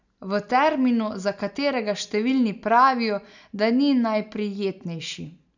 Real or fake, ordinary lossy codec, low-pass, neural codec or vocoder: real; none; 7.2 kHz; none